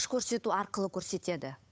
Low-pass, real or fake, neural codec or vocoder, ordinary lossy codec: none; fake; codec, 16 kHz, 8 kbps, FunCodec, trained on Chinese and English, 25 frames a second; none